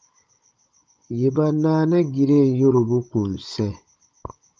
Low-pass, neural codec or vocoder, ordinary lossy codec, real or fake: 7.2 kHz; codec, 16 kHz, 16 kbps, FunCodec, trained on Chinese and English, 50 frames a second; Opus, 24 kbps; fake